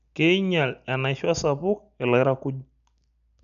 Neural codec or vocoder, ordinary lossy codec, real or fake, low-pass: none; none; real; 7.2 kHz